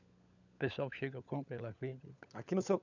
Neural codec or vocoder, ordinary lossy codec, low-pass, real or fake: codec, 16 kHz, 16 kbps, FunCodec, trained on LibriTTS, 50 frames a second; none; 7.2 kHz; fake